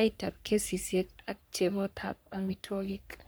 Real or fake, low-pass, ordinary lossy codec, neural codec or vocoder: fake; none; none; codec, 44.1 kHz, 3.4 kbps, Pupu-Codec